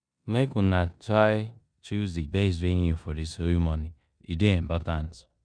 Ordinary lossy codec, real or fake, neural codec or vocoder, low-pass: none; fake; codec, 16 kHz in and 24 kHz out, 0.9 kbps, LongCat-Audio-Codec, four codebook decoder; 9.9 kHz